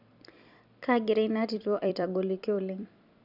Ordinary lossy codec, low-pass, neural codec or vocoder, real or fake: none; 5.4 kHz; vocoder, 44.1 kHz, 128 mel bands every 256 samples, BigVGAN v2; fake